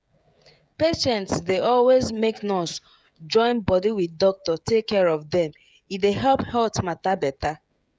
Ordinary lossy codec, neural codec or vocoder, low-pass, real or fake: none; codec, 16 kHz, 16 kbps, FreqCodec, smaller model; none; fake